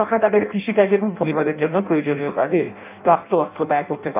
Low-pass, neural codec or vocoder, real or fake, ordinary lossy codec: 3.6 kHz; codec, 16 kHz in and 24 kHz out, 0.6 kbps, FireRedTTS-2 codec; fake; none